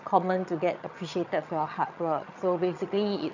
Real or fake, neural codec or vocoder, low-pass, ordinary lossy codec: fake; vocoder, 22.05 kHz, 80 mel bands, HiFi-GAN; 7.2 kHz; none